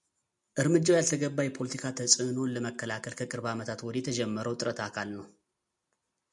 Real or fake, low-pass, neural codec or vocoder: real; 10.8 kHz; none